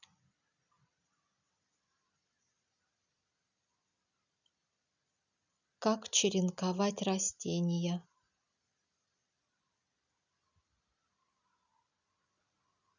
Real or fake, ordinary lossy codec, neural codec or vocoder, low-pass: real; none; none; 7.2 kHz